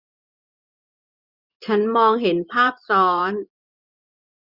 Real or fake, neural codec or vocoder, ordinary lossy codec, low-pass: fake; vocoder, 24 kHz, 100 mel bands, Vocos; none; 5.4 kHz